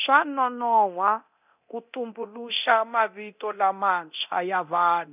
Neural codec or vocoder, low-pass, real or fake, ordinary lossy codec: codec, 24 kHz, 0.9 kbps, DualCodec; 3.6 kHz; fake; none